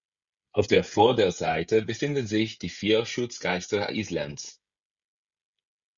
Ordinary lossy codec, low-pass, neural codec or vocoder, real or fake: Opus, 64 kbps; 7.2 kHz; codec, 16 kHz, 16 kbps, FreqCodec, smaller model; fake